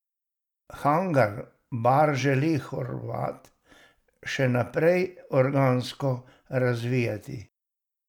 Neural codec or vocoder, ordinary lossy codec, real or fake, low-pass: none; none; real; 19.8 kHz